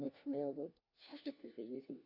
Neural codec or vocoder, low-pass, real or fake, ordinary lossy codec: codec, 16 kHz, 1 kbps, FunCodec, trained on LibriTTS, 50 frames a second; 5.4 kHz; fake; Opus, 64 kbps